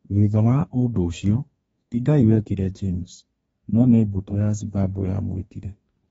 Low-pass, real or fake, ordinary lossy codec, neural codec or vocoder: 14.4 kHz; fake; AAC, 24 kbps; codec, 32 kHz, 1.9 kbps, SNAC